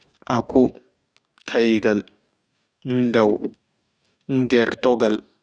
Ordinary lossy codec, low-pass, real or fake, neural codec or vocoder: none; 9.9 kHz; fake; codec, 44.1 kHz, 2.6 kbps, DAC